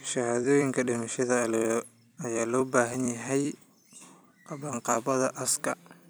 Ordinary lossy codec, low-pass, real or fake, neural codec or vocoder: none; none; fake; vocoder, 44.1 kHz, 128 mel bands every 256 samples, BigVGAN v2